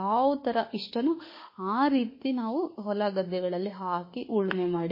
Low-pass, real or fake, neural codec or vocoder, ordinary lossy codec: 5.4 kHz; fake; autoencoder, 48 kHz, 32 numbers a frame, DAC-VAE, trained on Japanese speech; MP3, 24 kbps